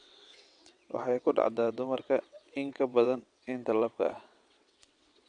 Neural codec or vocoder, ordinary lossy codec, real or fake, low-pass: vocoder, 22.05 kHz, 80 mel bands, WaveNeXt; AAC, 64 kbps; fake; 9.9 kHz